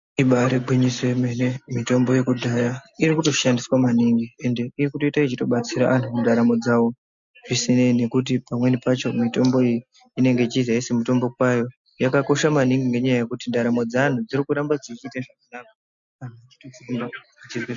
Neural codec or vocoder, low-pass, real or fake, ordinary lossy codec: none; 7.2 kHz; real; MP3, 64 kbps